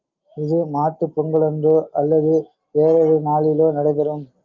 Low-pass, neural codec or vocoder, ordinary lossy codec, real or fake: 7.2 kHz; none; Opus, 24 kbps; real